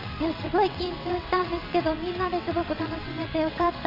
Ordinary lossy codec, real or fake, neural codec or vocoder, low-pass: AAC, 48 kbps; fake; vocoder, 22.05 kHz, 80 mel bands, WaveNeXt; 5.4 kHz